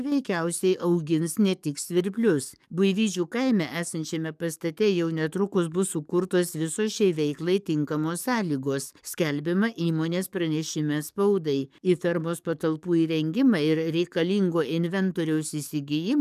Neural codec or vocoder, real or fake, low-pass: codec, 44.1 kHz, 7.8 kbps, DAC; fake; 14.4 kHz